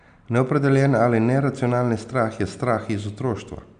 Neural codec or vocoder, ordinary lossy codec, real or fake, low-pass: none; none; real; 9.9 kHz